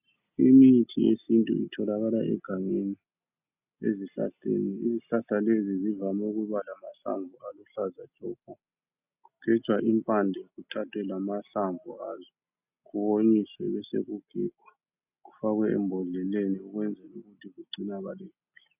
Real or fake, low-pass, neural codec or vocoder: real; 3.6 kHz; none